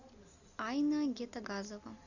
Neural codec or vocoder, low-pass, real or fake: none; 7.2 kHz; real